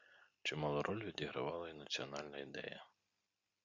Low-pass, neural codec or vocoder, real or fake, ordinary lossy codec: 7.2 kHz; none; real; Opus, 64 kbps